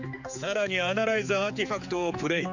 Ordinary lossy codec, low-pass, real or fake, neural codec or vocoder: none; 7.2 kHz; fake; codec, 16 kHz, 4 kbps, X-Codec, HuBERT features, trained on general audio